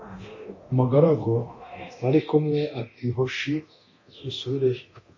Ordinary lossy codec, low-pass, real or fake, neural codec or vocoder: MP3, 32 kbps; 7.2 kHz; fake; codec, 24 kHz, 0.9 kbps, DualCodec